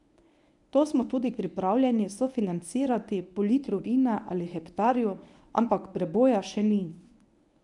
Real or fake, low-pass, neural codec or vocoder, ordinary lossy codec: fake; 10.8 kHz; codec, 24 kHz, 0.9 kbps, WavTokenizer, medium speech release version 1; none